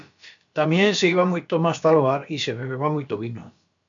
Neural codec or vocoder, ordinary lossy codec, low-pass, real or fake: codec, 16 kHz, about 1 kbps, DyCAST, with the encoder's durations; MP3, 64 kbps; 7.2 kHz; fake